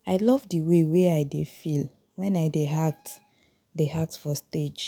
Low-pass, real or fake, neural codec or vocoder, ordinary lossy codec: 19.8 kHz; fake; codec, 44.1 kHz, 7.8 kbps, DAC; none